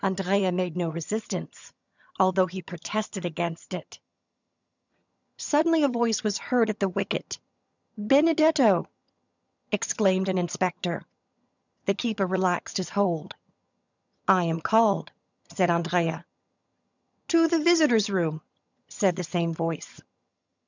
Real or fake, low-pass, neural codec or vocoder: fake; 7.2 kHz; vocoder, 22.05 kHz, 80 mel bands, HiFi-GAN